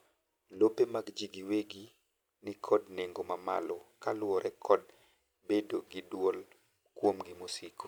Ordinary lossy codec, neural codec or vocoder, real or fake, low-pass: none; none; real; none